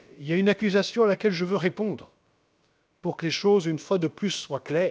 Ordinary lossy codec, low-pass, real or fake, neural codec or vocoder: none; none; fake; codec, 16 kHz, about 1 kbps, DyCAST, with the encoder's durations